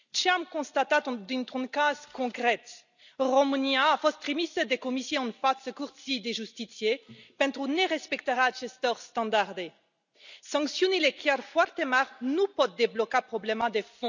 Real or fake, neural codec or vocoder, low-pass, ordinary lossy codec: real; none; 7.2 kHz; none